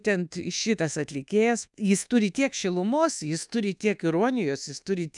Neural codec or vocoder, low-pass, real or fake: codec, 24 kHz, 1.2 kbps, DualCodec; 10.8 kHz; fake